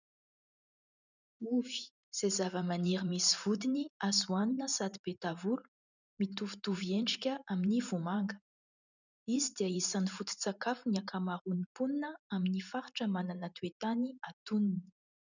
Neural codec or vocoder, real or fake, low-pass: vocoder, 44.1 kHz, 128 mel bands every 512 samples, BigVGAN v2; fake; 7.2 kHz